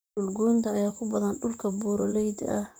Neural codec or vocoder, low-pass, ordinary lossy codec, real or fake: vocoder, 44.1 kHz, 128 mel bands, Pupu-Vocoder; none; none; fake